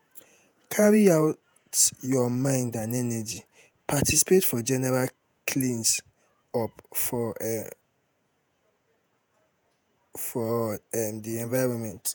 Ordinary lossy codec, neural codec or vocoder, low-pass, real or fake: none; vocoder, 48 kHz, 128 mel bands, Vocos; none; fake